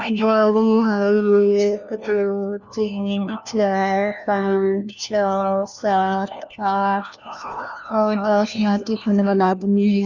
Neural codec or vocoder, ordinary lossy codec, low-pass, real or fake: codec, 16 kHz, 1 kbps, FunCodec, trained on LibriTTS, 50 frames a second; none; 7.2 kHz; fake